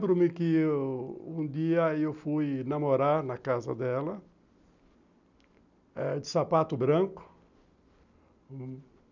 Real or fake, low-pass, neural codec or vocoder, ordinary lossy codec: real; 7.2 kHz; none; none